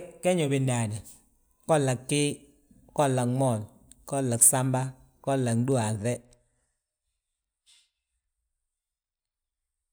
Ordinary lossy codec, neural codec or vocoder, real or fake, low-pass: none; none; real; none